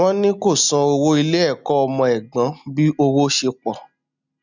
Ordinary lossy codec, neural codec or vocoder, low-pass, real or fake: none; none; 7.2 kHz; real